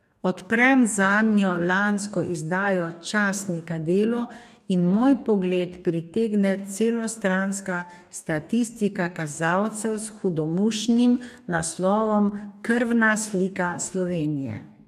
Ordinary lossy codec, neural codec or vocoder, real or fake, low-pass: none; codec, 44.1 kHz, 2.6 kbps, DAC; fake; 14.4 kHz